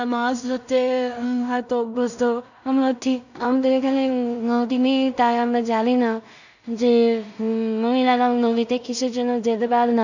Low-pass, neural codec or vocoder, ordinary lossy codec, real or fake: 7.2 kHz; codec, 16 kHz in and 24 kHz out, 0.4 kbps, LongCat-Audio-Codec, two codebook decoder; none; fake